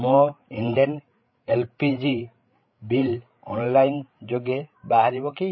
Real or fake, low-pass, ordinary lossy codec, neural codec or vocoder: fake; 7.2 kHz; MP3, 24 kbps; codec, 16 kHz, 16 kbps, FreqCodec, larger model